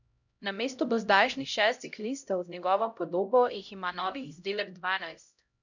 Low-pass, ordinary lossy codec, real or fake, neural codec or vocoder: 7.2 kHz; none; fake; codec, 16 kHz, 0.5 kbps, X-Codec, HuBERT features, trained on LibriSpeech